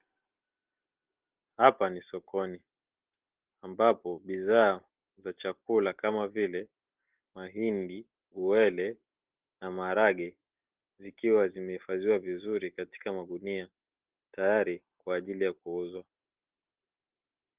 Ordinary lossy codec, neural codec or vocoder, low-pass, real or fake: Opus, 16 kbps; none; 3.6 kHz; real